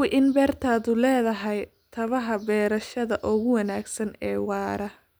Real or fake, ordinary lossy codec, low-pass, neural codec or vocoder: real; none; none; none